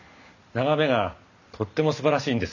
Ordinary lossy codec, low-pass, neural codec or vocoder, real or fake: none; 7.2 kHz; none; real